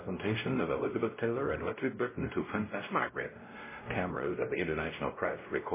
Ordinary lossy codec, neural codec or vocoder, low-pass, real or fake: MP3, 16 kbps; codec, 16 kHz, 0.5 kbps, X-Codec, WavLM features, trained on Multilingual LibriSpeech; 3.6 kHz; fake